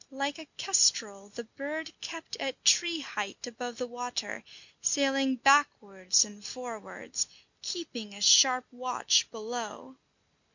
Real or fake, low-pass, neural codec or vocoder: real; 7.2 kHz; none